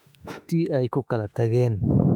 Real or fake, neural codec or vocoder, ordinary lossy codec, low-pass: fake; autoencoder, 48 kHz, 32 numbers a frame, DAC-VAE, trained on Japanese speech; none; 19.8 kHz